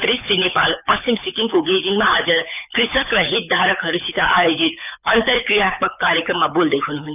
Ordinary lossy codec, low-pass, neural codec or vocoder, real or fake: none; 3.6 kHz; codec, 24 kHz, 6 kbps, HILCodec; fake